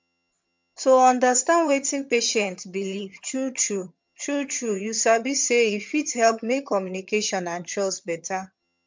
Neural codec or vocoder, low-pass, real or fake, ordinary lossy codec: vocoder, 22.05 kHz, 80 mel bands, HiFi-GAN; 7.2 kHz; fake; MP3, 64 kbps